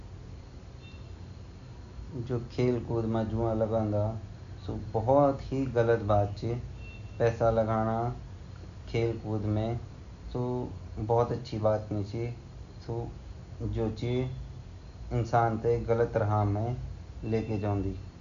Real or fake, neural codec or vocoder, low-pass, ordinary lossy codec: real; none; 7.2 kHz; none